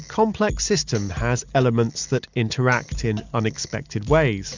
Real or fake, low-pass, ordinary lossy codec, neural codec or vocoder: real; 7.2 kHz; Opus, 64 kbps; none